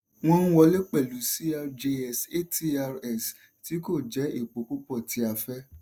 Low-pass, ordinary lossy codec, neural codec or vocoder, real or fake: none; none; none; real